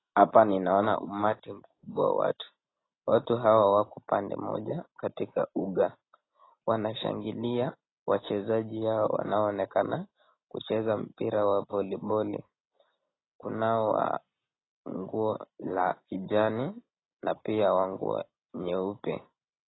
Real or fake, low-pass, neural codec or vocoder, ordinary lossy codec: real; 7.2 kHz; none; AAC, 16 kbps